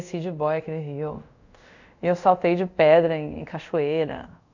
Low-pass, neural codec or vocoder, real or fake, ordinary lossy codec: 7.2 kHz; codec, 24 kHz, 0.5 kbps, DualCodec; fake; none